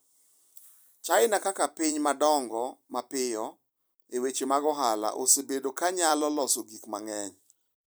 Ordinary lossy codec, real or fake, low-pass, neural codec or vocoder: none; fake; none; vocoder, 44.1 kHz, 128 mel bands every 256 samples, BigVGAN v2